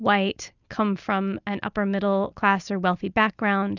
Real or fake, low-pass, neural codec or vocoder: real; 7.2 kHz; none